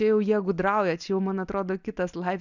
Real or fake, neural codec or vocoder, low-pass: real; none; 7.2 kHz